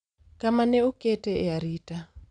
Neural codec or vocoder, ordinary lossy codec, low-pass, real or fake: none; none; 9.9 kHz; real